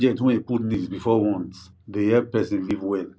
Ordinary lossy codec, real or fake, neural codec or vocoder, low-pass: none; real; none; none